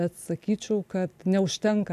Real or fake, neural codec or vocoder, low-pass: real; none; 14.4 kHz